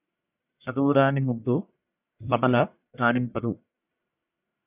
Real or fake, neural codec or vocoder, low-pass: fake; codec, 44.1 kHz, 1.7 kbps, Pupu-Codec; 3.6 kHz